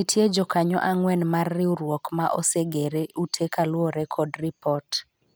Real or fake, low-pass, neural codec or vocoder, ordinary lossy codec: real; none; none; none